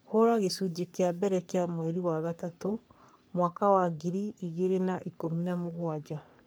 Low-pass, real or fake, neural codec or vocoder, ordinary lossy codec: none; fake; codec, 44.1 kHz, 3.4 kbps, Pupu-Codec; none